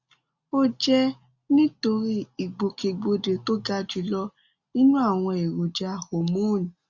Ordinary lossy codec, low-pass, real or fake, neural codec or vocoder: Opus, 64 kbps; 7.2 kHz; real; none